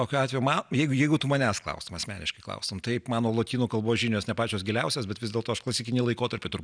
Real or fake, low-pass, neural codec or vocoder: real; 9.9 kHz; none